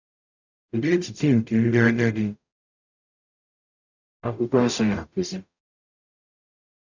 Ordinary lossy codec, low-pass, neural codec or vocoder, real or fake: none; 7.2 kHz; codec, 44.1 kHz, 0.9 kbps, DAC; fake